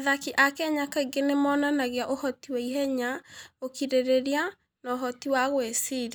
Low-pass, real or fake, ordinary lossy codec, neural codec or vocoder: none; real; none; none